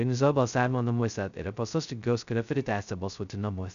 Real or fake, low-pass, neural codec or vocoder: fake; 7.2 kHz; codec, 16 kHz, 0.2 kbps, FocalCodec